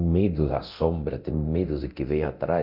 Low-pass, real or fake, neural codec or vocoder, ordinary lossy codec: 5.4 kHz; fake; codec, 24 kHz, 0.9 kbps, DualCodec; none